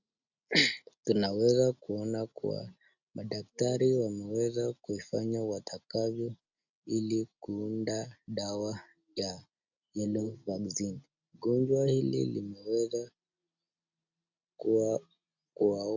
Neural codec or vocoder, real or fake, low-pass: none; real; 7.2 kHz